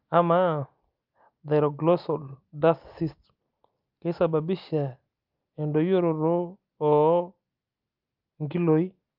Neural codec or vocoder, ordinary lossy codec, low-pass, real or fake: none; Opus, 24 kbps; 5.4 kHz; real